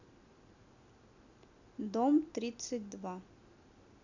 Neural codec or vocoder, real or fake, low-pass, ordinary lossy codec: none; real; 7.2 kHz; none